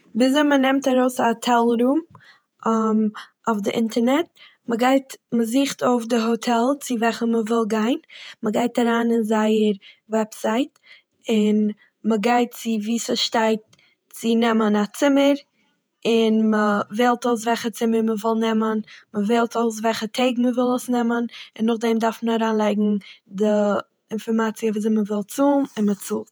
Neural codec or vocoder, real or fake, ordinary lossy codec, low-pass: vocoder, 48 kHz, 128 mel bands, Vocos; fake; none; none